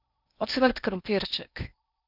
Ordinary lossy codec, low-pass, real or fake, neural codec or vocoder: none; 5.4 kHz; fake; codec, 16 kHz in and 24 kHz out, 0.8 kbps, FocalCodec, streaming, 65536 codes